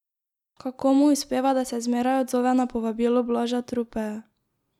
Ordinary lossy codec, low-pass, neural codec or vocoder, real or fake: none; 19.8 kHz; none; real